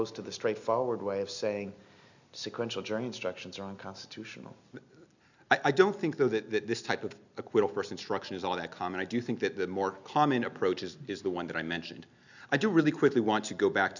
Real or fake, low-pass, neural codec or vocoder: real; 7.2 kHz; none